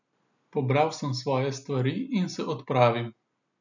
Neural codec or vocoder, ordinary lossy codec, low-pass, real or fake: none; none; 7.2 kHz; real